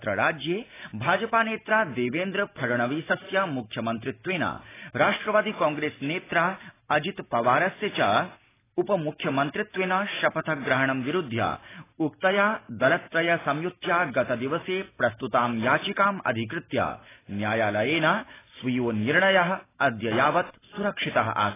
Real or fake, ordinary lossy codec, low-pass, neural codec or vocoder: real; AAC, 16 kbps; 3.6 kHz; none